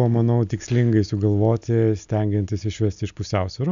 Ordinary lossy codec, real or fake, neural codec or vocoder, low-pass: MP3, 96 kbps; real; none; 7.2 kHz